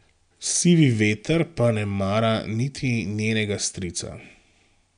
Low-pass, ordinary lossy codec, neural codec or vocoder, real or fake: 9.9 kHz; none; none; real